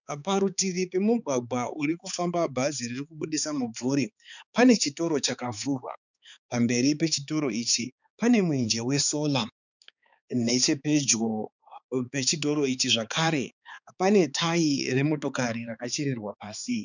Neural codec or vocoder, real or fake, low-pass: codec, 16 kHz, 4 kbps, X-Codec, HuBERT features, trained on balanced general audio; fake; 7.2 kHz